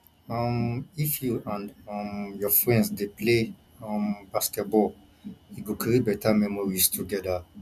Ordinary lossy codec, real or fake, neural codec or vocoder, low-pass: none; real; none; 14.4 kHz